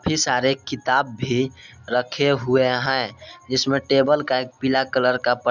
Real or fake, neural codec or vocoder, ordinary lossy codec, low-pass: real; none; none; 7.2 kHz